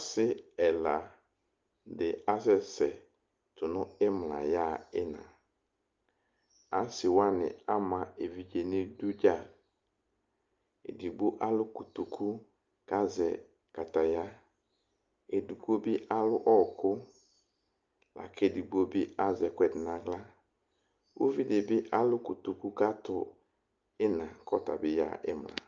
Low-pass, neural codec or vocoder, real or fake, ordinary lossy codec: 7.2 kHz; none; real; Opus, 24 kbps